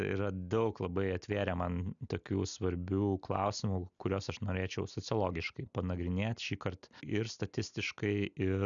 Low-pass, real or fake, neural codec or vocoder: 7.2 kHz; real; none